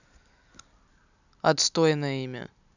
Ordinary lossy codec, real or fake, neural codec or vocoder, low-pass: none; real; none; 7.2 kHz